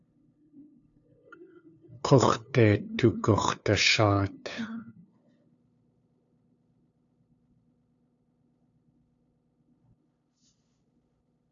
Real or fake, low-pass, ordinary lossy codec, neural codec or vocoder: fake; 7.2 kHz; AAC, 64 kbps; codec, 16 kHz, 2 kbps, FunCodec, trained on LibriTTS, 25 frames a second